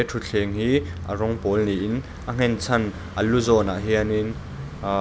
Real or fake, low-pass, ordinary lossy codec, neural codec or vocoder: real; none; none; none